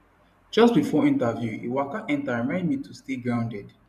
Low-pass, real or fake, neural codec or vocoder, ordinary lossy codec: 14.4 kHz; fake; vocoder, 44.1 kHz, 128 mel bands every 512 samples, BigVGAN v2; none